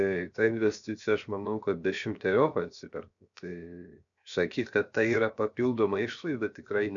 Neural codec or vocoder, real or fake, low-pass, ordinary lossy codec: codec, 16 kHz, 0.7 kbps, FocalCodec; fake; 7.2 kHz; MP3, 96 kbps